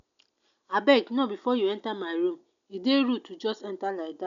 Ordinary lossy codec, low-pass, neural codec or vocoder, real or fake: none; 7.2 kHz; none; real